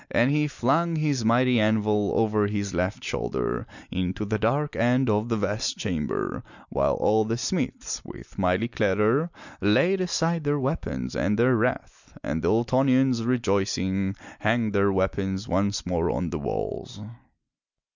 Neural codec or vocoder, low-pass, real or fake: none; 7.2 kHz; real